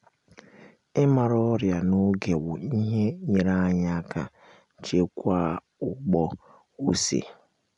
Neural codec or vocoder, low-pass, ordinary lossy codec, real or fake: none; 10.8 kHz; none; real